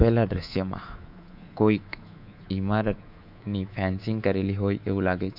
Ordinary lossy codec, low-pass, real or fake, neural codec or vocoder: none; 5.4 kHz; fake; codec, 24 kHz, 3.1 kbps, DualCodec